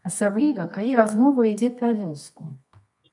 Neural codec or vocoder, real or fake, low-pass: codec, 24 kHz, 0.9 kbps, WavTokenizer, medium music audio release; fake; 10.8 kHz